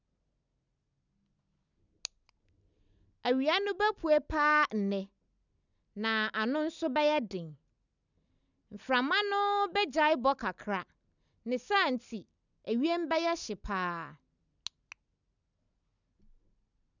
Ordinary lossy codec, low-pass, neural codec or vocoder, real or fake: none; 7.2 kHz; none; real